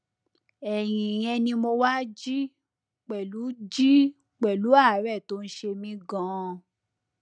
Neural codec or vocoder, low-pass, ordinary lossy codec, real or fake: none; 9.9 kHz; none; real